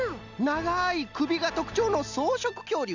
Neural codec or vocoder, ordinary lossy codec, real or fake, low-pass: none; Opus, 64 kbps; real; 7.2 kHz